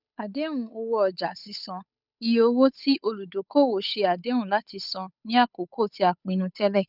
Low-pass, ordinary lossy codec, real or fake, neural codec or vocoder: 5.4 kHz; none; fake; codec, 16 kHz, 8 kbps, FunCodec, trained on Chinese and English, 25 frames a second